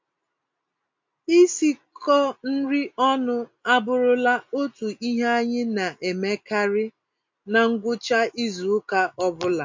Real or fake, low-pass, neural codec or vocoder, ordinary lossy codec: real; 7.2 kHz; none; MP3, 48 kbps